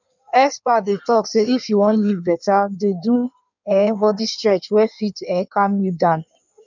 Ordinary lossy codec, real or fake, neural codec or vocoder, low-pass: none; fake; codec, 16 kHz in and 24 kHz out, 1.1 kbps, FireRedTTS-2 codec; 7.2 kHz